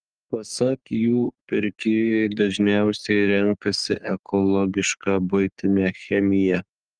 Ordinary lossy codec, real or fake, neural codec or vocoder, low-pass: Opus, 32 kbps; fake; codec, 44.1 kHz, 3.4 kbps, Pupu-Codec; 9.9 kHz